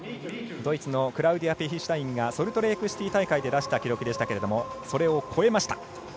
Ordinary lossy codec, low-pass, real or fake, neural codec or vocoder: none; none; real; none